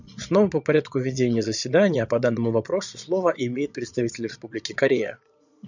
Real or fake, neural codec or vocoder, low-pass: fake; vocoder, 22.05 kHz, 80 mel bands, Vocos; 7.2 kHz